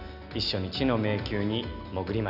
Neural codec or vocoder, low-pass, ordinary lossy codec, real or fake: none; 5.4 kHz; none; real